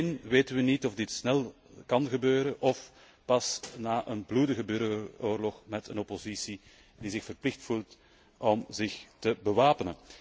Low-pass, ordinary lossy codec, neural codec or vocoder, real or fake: none; none; none; real